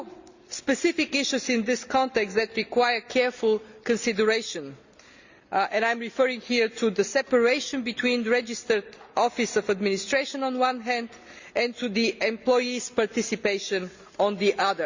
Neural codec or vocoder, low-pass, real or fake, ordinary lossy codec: none; 7.2 kHz; real; Opus, 64 kbps